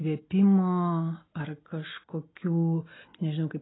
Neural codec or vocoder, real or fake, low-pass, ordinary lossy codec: none; real; 7.2 kHz; AAC, 16 kbps